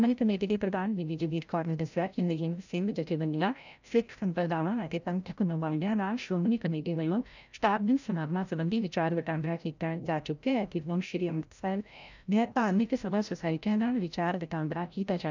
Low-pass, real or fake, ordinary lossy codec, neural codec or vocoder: 7.2 kHz; fake; none; codec, 16 kHz, 0.5 kbps, FreqCodec, larger model